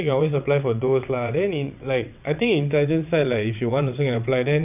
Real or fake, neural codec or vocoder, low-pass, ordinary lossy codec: fake; vocoder, 22.05 kHz, 80 mel bands, WaveNeXt; 3.6 kHz; none